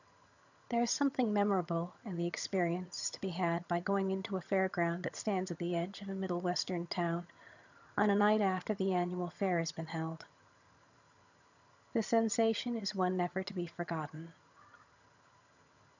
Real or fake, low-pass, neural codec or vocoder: fake; 7.2 kHz; vocoder, 22.05 kHz, 80 mel bands, HiFi-GAN